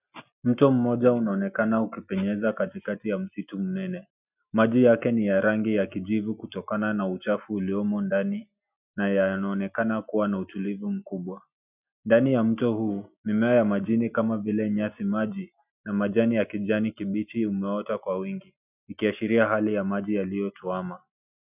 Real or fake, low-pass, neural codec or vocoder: real; 3.6 kHz; none